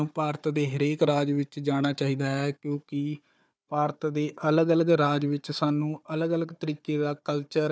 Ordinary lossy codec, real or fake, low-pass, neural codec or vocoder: none; fake; none; codec, 16 kHz, 4 kbps, FunCodec, trained on Chinese and English, 50 frames a second